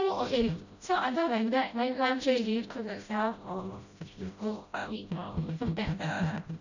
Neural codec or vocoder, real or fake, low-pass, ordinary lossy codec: codec, 16 kHz, 0.5 kbps, FreqCodec, smaller model; fake; 7.2 kHz; none